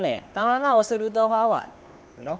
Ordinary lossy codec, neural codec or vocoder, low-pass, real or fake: none; codec, 16 kHz, 2 kbps, X-Codec, HuBERT features, trained on LibriSpeech; none; fake